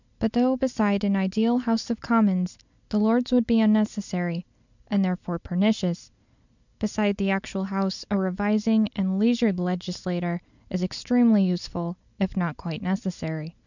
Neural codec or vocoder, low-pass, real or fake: none; 7.2 kHz; real